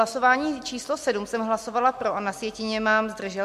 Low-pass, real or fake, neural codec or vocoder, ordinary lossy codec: 14.4 kHz; real; none; MP3, 64 kbps